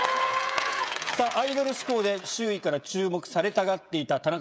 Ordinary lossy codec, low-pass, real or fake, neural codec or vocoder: none; none; fake; codec, 16 kHz, 16 kbps, FreqCodec, smaller model